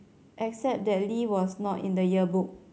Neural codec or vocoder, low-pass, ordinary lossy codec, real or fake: none; none; none; real